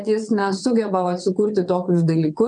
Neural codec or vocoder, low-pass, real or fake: vocoder, 22.05 kHz, 80 mel bands, WaveNeXt; 9.9 kHz; fake